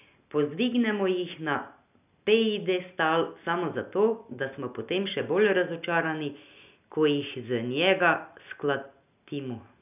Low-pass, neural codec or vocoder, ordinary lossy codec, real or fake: 3.6 kHz; none; none; real